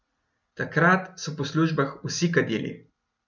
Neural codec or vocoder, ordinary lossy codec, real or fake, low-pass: none; none; real; 7.2 kHz